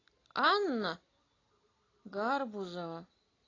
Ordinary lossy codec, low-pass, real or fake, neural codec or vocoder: AAC, 32 kbps; 7.2 kHz; real; none